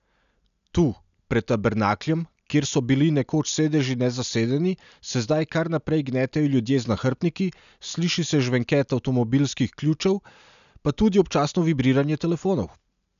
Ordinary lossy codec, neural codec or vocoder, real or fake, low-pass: none; none; real; 7.2 kHz